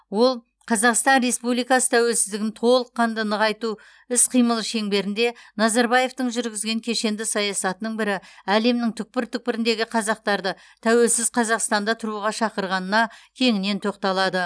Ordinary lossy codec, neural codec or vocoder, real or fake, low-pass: none; none; real; none